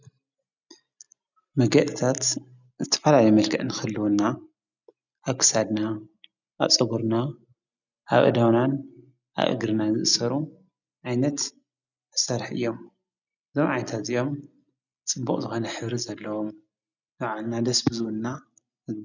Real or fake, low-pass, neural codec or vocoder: real; 7.2 kHz; none